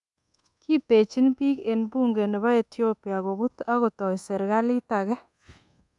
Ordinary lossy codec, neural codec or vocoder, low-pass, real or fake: none; codec, 24 kHz, 1.2 kbps, DualCodec; 10.8 kHz; fake